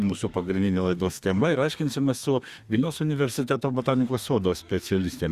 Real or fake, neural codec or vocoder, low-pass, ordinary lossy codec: fake; codec, 32 kHz, 1.9 kbps, SNAC; 14.4 kHz; Opus, 64 kbps